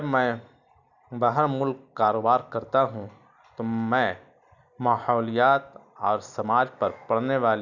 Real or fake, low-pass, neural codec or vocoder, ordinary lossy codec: real; 7.2 kHz; none; none